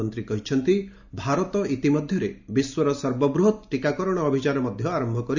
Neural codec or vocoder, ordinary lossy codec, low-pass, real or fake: none; none; 7.2 kHz; real